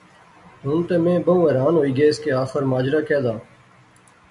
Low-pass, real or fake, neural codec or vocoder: 10.8 kHz; real; none